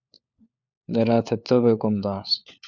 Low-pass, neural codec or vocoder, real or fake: 7.2 kHz; codec, 16 kHz, 4 kbps, FunCodec, trained on LibriTTS, 50 frames a second; fake